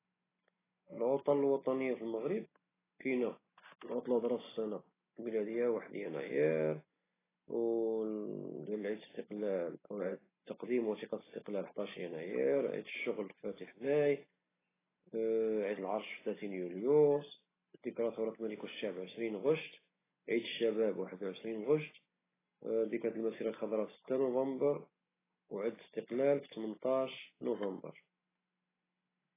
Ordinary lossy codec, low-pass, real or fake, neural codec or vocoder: AAC, 16 kbps; 3.6 kHz; real; none